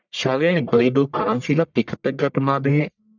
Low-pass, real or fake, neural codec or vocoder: 7.2 kHz; fake; codec, 44.1 kHz, 1.7 kbps, Pupu-Codec